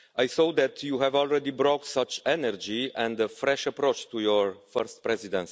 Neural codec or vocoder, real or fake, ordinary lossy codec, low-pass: none; real; none; none